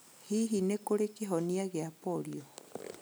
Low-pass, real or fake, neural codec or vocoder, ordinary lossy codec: none; real; none; none